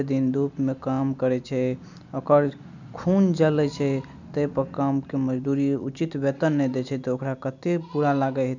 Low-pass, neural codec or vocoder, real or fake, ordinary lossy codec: 7.2 kHz; none; real; none